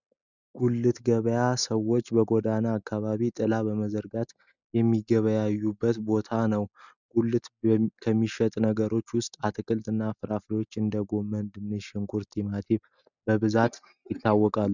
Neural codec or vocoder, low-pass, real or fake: none; 7.2 kHz; real